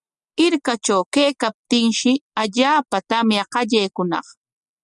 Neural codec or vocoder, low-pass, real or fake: none; 10.8 kHz; real